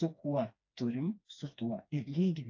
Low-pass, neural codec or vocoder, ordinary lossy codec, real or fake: 7.2 kHz; codec, 16 kHz, 2 kbps, FreqCodec, smaller model; AAC, 48 kbps; fake